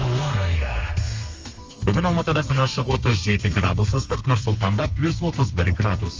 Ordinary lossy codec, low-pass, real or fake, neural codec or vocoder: Opus, 32 kbps; 7.2 kHz; fake; codec, 32 kHz, 1.9 kbps, SNAC